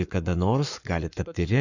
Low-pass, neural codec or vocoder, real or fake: 7.2 kHz; codec, 44.1 kHz, 7.8 kbps, Pupu-Codec; fake